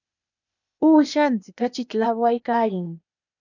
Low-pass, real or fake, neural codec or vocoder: 7.2 kHz; fake; codec, 16 kHz, 0.8 kbps, ZipCodec